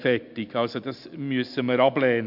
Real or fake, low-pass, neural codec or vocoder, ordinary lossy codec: real; 5.4 kHz; none; MP3, 48 kbps